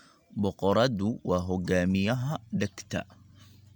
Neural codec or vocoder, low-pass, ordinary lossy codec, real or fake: none; 19.8 kHz; MP3, 96 kbps; real